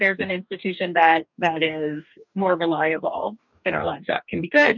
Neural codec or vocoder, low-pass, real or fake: codec, 44.1 kHz, 2.6 kbps, DAC; 7.2 kHz; fake